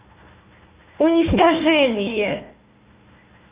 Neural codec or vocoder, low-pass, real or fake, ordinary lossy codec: codec, 16 kHz, 1 kbps, FunCodec, trained on Chinese and English, 50 frames a second; 3.6 kHz; fake; Opus, 24 kbps